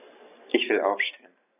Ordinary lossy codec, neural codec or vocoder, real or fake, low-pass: none; none; real; 3.6 kHz